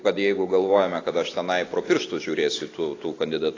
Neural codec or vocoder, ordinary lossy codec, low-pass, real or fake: none; AAC, 32 kbps; 7.2 kHz; real